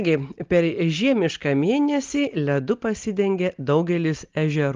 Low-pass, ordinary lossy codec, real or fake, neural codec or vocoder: 7.2 kHz; Opus, 32 kbps; real; none